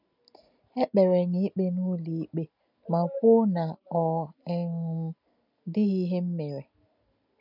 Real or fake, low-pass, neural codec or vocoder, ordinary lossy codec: real; 5.4 kHz; none; none